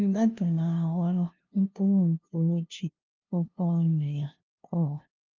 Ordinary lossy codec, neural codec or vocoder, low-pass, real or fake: Opus, 32 kbps; codec, 16 kHz, 0.5 kbps, FunCodec, trained on LibriTTS, 25 frames a second; 7.2 kHz; fake